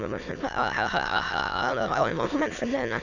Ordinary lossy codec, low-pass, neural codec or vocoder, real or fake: none; 7.2 kHz; autoencoder, 22.05 kHz, a latent of 192 numbers a frame, VITS, trained on many speakers; fake